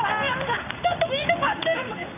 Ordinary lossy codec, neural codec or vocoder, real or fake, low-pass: none; codec, 16 kHz in and 24 kHz out, 1 kbps, XY-Tokenizer; fake; 3.6 kHz